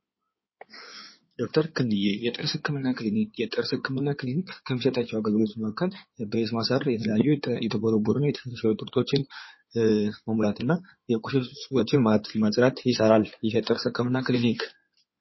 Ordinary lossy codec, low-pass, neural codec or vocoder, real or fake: MP3, 24 kbps; 7.2 kHz; codec, 16 kHz in and 24 kHz out, 2.2 kbps, FireRedTTS-2 codec; fake